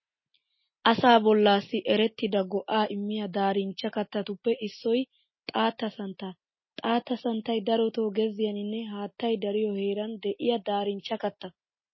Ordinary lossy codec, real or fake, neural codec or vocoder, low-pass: MP3, 24 kbps; real; none; 7.2 kHz